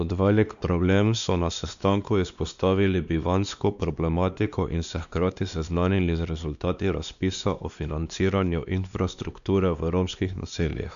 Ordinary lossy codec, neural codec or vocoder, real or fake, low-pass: none; codec, 16 kHz, 2 kbps, X-Codec, WavLM features, trained on Multilingual LibriSpeech; fake; 7.2 kHz